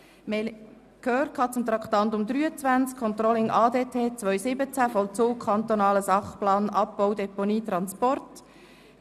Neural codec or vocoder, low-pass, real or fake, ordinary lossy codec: none; 14.4 kHz; real; none